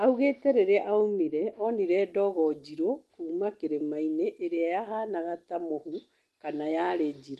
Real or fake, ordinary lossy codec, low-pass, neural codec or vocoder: real; Opus, 24 kbps; 14.4 kHz; none